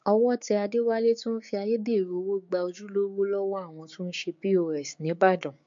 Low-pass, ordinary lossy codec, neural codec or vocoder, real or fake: 7.2 kHz; MP3, 48 kbps; codec, 16 kHz, 6 kbps, DAC; fake